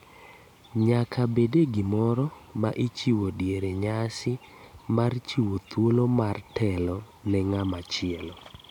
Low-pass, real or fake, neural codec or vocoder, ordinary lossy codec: 19.8 kHz; real; none; none